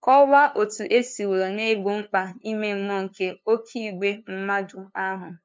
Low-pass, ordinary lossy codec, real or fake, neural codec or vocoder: none; none; fake; codec, 16 kHz, 2 kbps, FunCodec, trained on LibriTTS, 25 frames a second